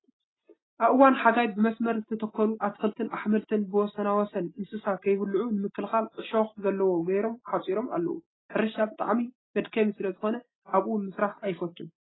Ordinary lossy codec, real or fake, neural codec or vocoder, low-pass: AAC, 16 kbps; real; none; 7.2 kHz